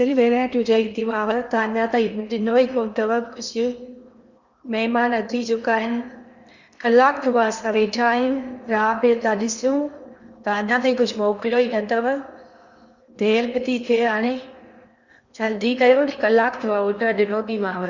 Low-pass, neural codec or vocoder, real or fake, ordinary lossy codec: 7.2 kHz; codec, 16 kHz in and 24 kHz out, 0.8 kbps, FocalCodec, streaming, 65536 codes; fake; Opus, 64 kbps